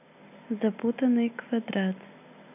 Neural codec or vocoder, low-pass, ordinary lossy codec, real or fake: none; 3.6 kHz; none; real